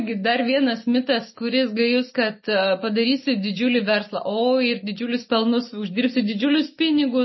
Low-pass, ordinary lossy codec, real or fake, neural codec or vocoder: 7.2 kHz; MP3, 24 kbps; real; none